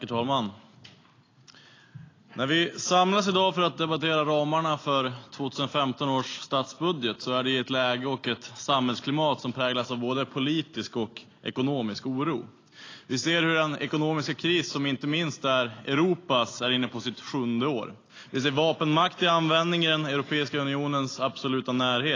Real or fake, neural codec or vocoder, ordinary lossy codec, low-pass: real; none; AAC, 32 kbps; 7.2 kHz